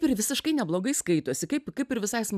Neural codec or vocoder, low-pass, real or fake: none; 14.4 kHz; real